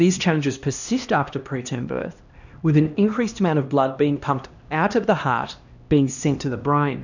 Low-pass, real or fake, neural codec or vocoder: 7.2 kHz; fake; codec, 16 kHz, 1 kbps, X-Codec, HuBERT features, trained on LibriSpeech